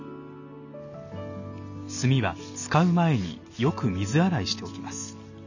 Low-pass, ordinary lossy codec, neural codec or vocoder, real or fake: 7.2 kHz; none; none; real